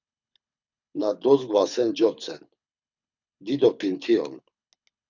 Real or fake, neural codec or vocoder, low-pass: fake; codec, 24 kHz, 6 kbps, HILCodec; 7.2 kHz